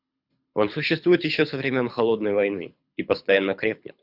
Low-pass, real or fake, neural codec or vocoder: 5.4 kHz; fake; codec, 24 kHz, 6 kbps, HILCodec